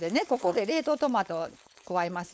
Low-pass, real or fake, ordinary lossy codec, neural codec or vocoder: none; fake; none; codec, 16 kHz, 4.8 kbps, FACodec